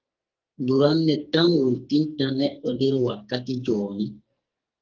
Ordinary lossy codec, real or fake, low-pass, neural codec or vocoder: Opus, 24 kbps; fake; 7.2 kHz; codec, 44.1 kHz, 2.6 kbps, SNAC